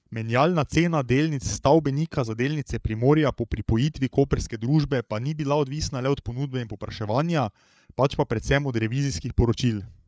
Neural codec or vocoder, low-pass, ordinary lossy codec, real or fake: codec, 16 kHz, 8 kbps, FreqCodec, larger model; none; none; fake